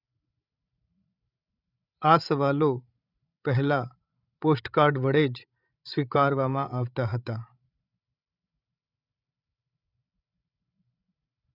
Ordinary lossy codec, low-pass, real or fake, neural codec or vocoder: none; 5.4 kHz; fake; codec, 16 kHz, 16 kbps, FreqCodec, larger model